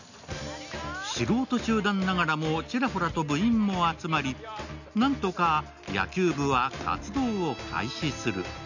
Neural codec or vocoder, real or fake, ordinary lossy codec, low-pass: none; real; none; 7.2 kHz